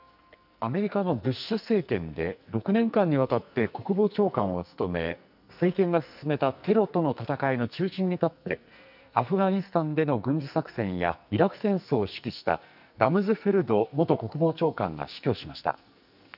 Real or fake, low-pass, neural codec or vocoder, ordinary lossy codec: fake; 5.4 kHz; codec, 44.1 kHz, 2.6 kbps, SNAC; none